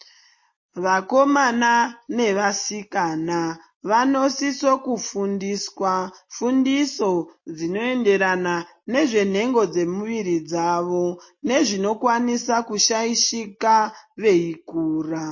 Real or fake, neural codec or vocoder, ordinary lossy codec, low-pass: real; none; MP3, 32 kbps; 7.2 kHz